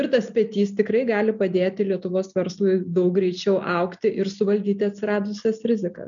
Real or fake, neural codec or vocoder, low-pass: real; none; 7.2 kHz